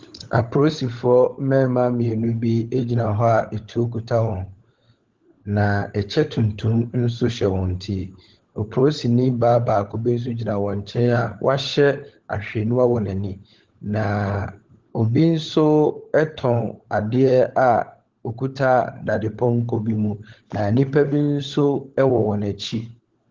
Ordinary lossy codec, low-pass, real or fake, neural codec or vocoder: Opus, 16 kbps; 7.2 kHz; fake; codec, 16 kHz, 16 kbps, FunCodec, trained on LibriTTS, 50 frames a second